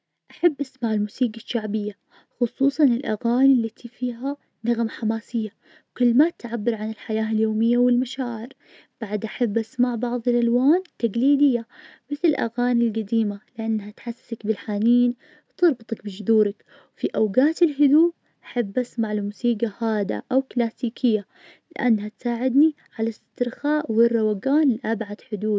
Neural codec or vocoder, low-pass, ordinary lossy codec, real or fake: none; none; none; real